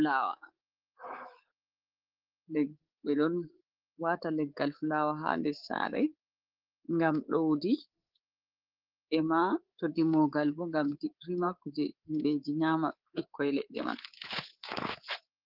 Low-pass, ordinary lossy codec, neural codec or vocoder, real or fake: 5.4 kHz; Opus, 16 kbps; codec, 24 kHz, 3.1 kbps, DualCodec; fake